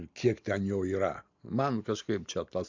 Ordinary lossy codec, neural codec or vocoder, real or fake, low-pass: MP3, 64 kbps; none; real; 7.2 kHz